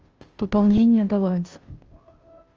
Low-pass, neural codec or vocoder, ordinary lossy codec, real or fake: 7.2 kHz; codec, 16 kHz, 0.5 kbps, FunCodec, trained on Chinese and English, 25 frames a second; Opus, 24 kbps; fake